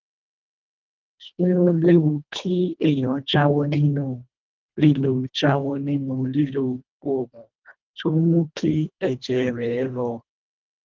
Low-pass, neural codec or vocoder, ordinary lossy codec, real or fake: 7.2 kHz; codec, 24 kHz, 1.5 kbps, HILCodec; Opus, 32 kbps; fake